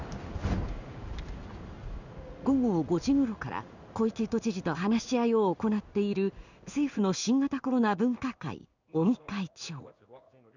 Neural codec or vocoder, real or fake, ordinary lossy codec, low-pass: codec, 16 kHz in and 24 kHz out, 1 kbps, XY-Tokenizer; fake; none; 7.2 kHz